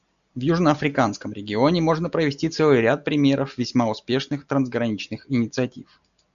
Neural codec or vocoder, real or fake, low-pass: none; real; 7.2 kHz